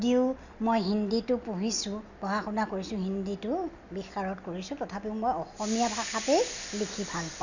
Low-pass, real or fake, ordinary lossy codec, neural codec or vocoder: 7.2 kHz; real; none; none